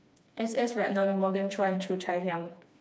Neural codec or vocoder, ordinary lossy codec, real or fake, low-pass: codec, 16 kHz, 2 kbps, FreqCodec, smaller model; none; fake; none